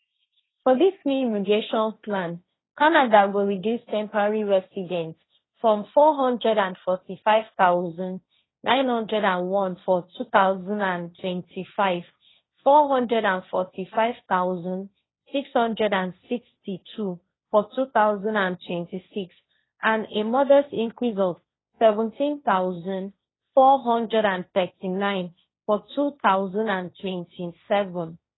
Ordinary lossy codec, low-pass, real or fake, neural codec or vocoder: AAC, 16 kbps; 7.2 kHz; fake; codec, 16 kHz, 1.1 kbps, Voila-Tokenizer